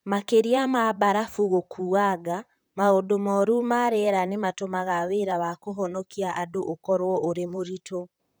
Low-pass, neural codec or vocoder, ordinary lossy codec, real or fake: none; vocoder, 44.1 kHz, 128 mel bands, Pupu-Vocoder; none; fake